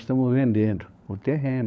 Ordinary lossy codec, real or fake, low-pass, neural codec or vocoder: none; fake; none; codec, 16 kHz, 16 kbps, FunCodec, trained on LibriTTS, 50 frames a second